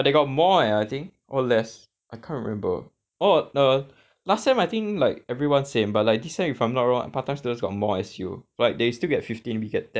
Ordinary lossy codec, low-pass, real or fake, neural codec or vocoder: none; none; real; none